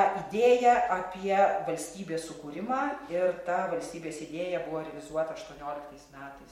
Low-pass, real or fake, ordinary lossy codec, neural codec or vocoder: 19.8 kHz; real; MP3, 64 kbps; none